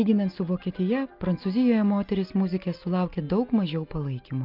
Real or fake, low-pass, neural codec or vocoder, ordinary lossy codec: real; 5.4 kHz; none; Opus, 24 kbps